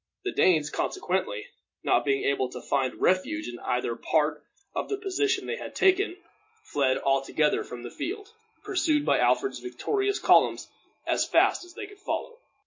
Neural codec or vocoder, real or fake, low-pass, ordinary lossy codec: none; real; 7.2 kHz; MP3, 32 kbps